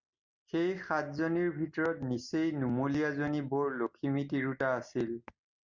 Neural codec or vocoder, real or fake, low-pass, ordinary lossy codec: none; real; 7.2 kHz; Opus, 64 kbps